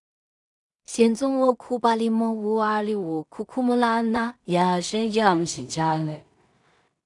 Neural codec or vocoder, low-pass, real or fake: codec, 16 kHz in and 24 kHz out, 0.4 kbps, LongCat-Audio-Codec, two codebook decoder; 10.8 kHz; fake